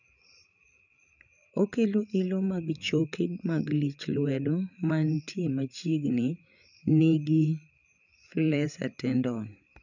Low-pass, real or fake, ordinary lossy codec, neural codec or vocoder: 7.2 kHz; fake; none; codec, 16 kHz, 8 kbps, FreqCodec, larger model